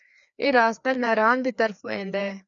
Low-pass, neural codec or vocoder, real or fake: 7.2 kHz; codec, 16 kHz, 2 kbps, FreqCodec, larger model; fake